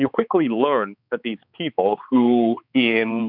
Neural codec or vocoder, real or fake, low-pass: codec, 16 kHz, 4 kbps, X-Codec, HuBERT features, trained on balanced general audio; fake; 5.4 kHz